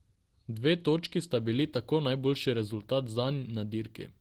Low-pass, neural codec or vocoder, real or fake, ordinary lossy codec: 19.8 kHz; none; real; Opus, 16 kbps